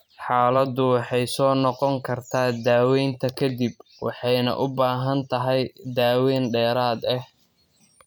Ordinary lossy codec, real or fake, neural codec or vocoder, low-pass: none; fake; vocoder, 44.1 kHz, 128 mel bands every 512 samples, BigVGAN v2; none